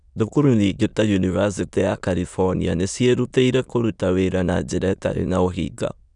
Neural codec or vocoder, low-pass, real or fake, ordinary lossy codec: autoencoder, 22.05 kHz, a latent of 192 numbers a frame, VITS, trained on many speakers; 9.9 kHz; fake; none